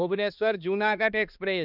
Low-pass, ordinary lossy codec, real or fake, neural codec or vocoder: 5.4 kHz; none; fake; codec, 16 kHz, 2 kbps, X-Codec, HuBERT features, trained on balanced general audio